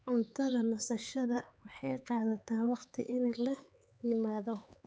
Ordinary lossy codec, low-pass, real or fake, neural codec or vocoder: none; none; fake; codec, 16 kHz, 4 kbps, X-Codec, HuBERT features, trained on LibriSpeech